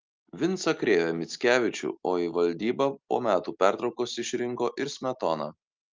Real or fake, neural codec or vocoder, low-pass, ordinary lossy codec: real; none; 7.2 kHz; Opus, 24 kbps